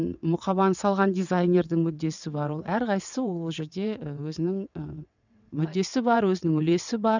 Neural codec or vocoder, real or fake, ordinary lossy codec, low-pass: vocoder, 22.05 kHz, 80 mel bands, WaveNeXt; fake; none; 7.2 kHz